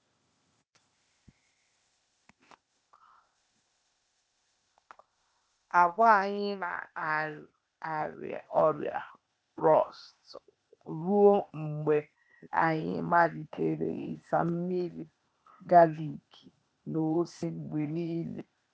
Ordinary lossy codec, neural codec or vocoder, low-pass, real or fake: none; codec, 16 kHz, 0.8 kbps, ZipCodec; none; fake